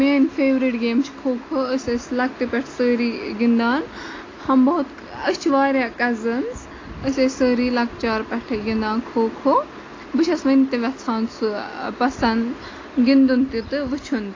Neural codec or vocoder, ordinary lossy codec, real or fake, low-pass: none; AAC, 32 kbps; real; 7.2 kHz